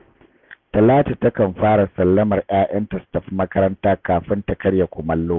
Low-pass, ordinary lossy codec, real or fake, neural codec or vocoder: 7.2 kHz; none; real; none